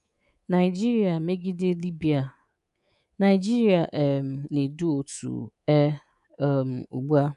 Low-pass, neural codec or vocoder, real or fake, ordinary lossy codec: 10.8 kHz; codec, 24 kHz, 3.1 kbps, DualCodec; fake; none